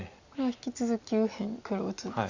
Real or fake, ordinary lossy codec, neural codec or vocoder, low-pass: real; Opus, 64 kbps; none; 7.2 kHz